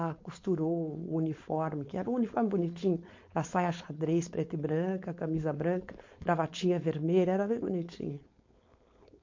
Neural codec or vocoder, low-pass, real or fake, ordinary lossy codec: codec, 16 kHz, 4.8 kbps, FACodec; 7.2 kHz; fake; MP3, 48 kbps